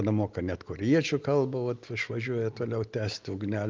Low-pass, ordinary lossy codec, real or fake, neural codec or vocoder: 7.2 kHz; Opus, 32 kbps; real; none